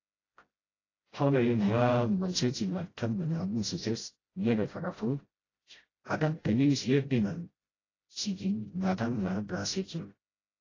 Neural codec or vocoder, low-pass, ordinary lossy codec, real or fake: codec, 16 kHz, 0.5 kbps, FreqCodec, smaller model; 7.2 kHz; AAC, 32 kbps; fake